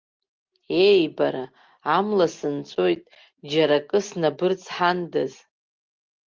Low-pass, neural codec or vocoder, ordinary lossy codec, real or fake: 7.2 kHz; none; Opus, 32 kbps; real